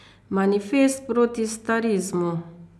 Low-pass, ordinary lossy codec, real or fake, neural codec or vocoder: none; none; real; none